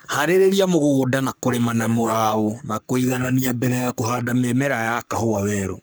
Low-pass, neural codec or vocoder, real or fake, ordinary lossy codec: none; codec, 44.1 kHz, 3.4 kbps, Pupu-Codec; fake; none